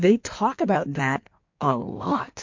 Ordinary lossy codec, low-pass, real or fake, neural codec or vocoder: MP3, 48 kbps; 7.2 kHz; fake; codec, 16 kHz in and 24 kHz out, 1.1 kbps, FireRedTTS-2 codec